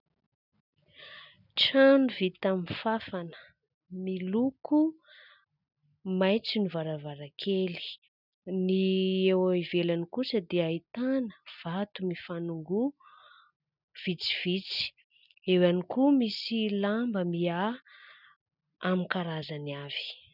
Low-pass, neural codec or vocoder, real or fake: 5.4 kHz; none; real